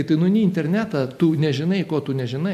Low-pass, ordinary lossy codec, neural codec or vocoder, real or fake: 14.4 kHz; MP3, 64 kbps; none; real